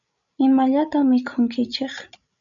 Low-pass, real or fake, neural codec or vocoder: 7.2 kHz; fake; codec, 16 kHz, 16 kbps, FreqCodec, larger model